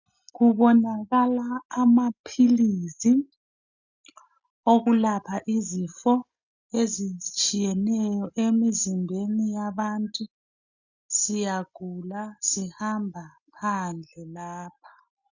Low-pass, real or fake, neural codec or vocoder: 7.2 kHz; real; none